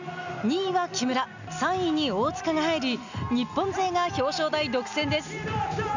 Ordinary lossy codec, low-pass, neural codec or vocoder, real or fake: none; 7.2 kHz; none; real